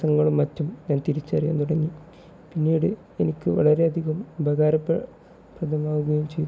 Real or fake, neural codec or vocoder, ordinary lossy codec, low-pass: real; none; none; none